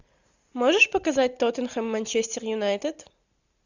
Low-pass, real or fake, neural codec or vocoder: 7.2 kHz; real; none